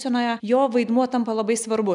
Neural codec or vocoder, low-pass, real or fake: none; 10.8 kHz; real